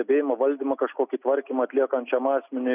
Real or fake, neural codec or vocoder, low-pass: real; none; 3.6 kHz